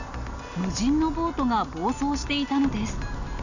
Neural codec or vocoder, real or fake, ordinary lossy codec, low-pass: none; real; none; 7.2 kHz